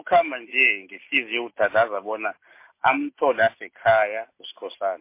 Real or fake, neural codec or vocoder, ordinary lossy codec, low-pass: real; none; MP3, 24 kbps; 3.6 kHz